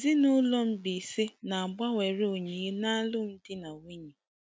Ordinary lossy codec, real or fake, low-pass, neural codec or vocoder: none; real; none; none